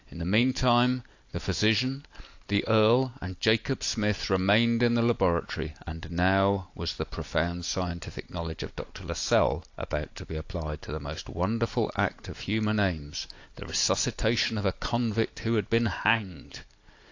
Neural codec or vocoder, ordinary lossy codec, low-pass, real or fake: none; AAC, 48 kbps; 7.2 kHz; real